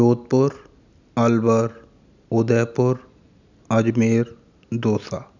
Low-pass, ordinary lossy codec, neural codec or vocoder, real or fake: 7.2 kHz; none; none; real